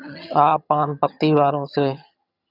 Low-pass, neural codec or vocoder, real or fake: 5.4 kHz; vocoder, 22.05 kHz, 80 mel bands, HiFi-GAN; fake